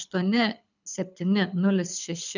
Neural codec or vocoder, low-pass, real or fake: codec, 24 kHz, 6 kbps, HILCodec; 7.2 kHz; fake